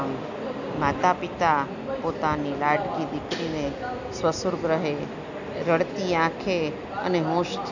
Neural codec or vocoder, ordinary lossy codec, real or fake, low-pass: none; none; real; 7.2 kHz